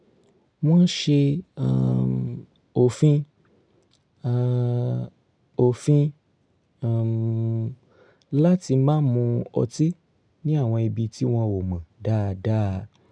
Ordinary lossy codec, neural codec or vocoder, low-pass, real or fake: none; none; 9.9 kHz; real